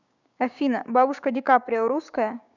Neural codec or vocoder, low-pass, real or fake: codec, 16 kHz, 6 kbps, DAC; 7.2 kHz; fake